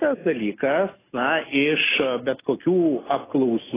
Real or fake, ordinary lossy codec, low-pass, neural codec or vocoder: real; AAC, 16 kbps; 3.6 kHz; none